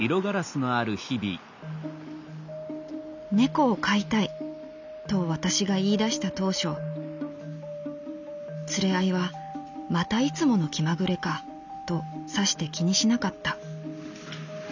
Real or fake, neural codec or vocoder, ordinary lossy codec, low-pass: real; none; none; 7.2 kHz